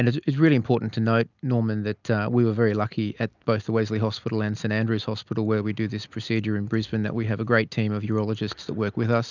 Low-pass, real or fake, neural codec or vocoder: 7.2 kHz; real; none